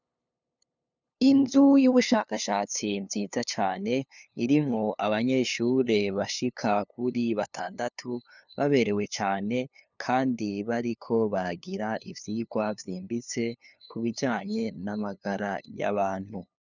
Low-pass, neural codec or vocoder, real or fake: 7.2 kHz; codec, 16 kHz, 2 kbps, FunCodec, trained on LibriTTS, 25 frames a second; fake